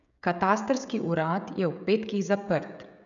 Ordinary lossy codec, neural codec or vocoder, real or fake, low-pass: none; codec, 16 kHz, 16 kbps, FreqCodec, smaller model; fake; 7.2 kHz